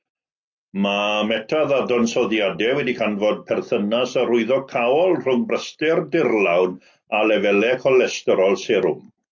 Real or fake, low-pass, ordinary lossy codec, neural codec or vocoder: real; 7.2 kHz; AAC, 48 kbps; none